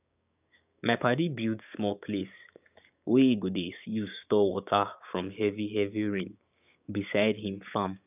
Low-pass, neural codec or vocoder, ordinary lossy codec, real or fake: 3.6 kHz; codec, 16 kHz, 6 kbps, DAC; none; fake